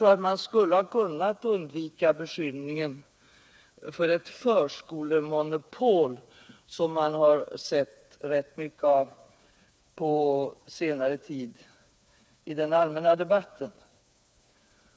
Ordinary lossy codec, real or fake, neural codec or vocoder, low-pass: none; fake; codec, 16 kHz, 4 kbps, FreqCodec, smaller model; none